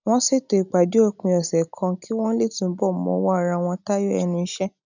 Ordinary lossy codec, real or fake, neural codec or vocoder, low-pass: none; real; none; 7.2 kHz